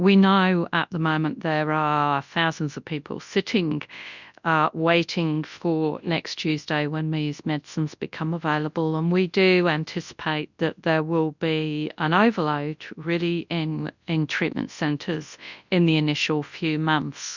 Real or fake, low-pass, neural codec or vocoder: fake; 7.2 kHz; codec, 24 kHz, 0.9 kbps, WavTokenizer, large speech release